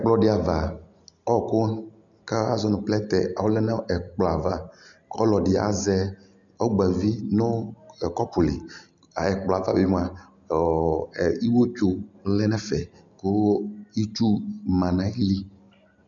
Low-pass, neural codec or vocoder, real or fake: 7.2 kHz; none; real